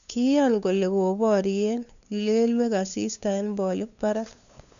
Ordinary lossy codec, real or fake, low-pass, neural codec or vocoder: none; fake; 7.2 kHz; codec, 16 kHz, 2 kbps, FunCodec, trained on LibriTTS, 25 frames a second